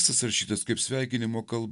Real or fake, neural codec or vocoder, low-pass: real; none; 10.8 kHz